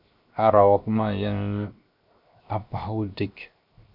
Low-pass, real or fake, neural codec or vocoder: 5.4 kHz; fake; codec, 16 kHz, 0.7 kbps, FocalCodec